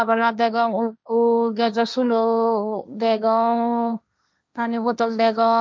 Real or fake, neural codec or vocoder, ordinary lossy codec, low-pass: fake; codec, 16 kHz, 1.1 kbps, Voila-Tokenizer; none; none